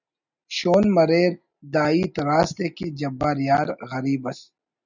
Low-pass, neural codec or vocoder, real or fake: 7.2 kHz; none; real